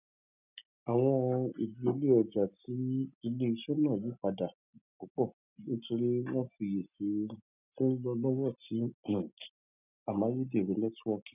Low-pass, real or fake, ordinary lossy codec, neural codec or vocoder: 3.6 kHz; real; none; none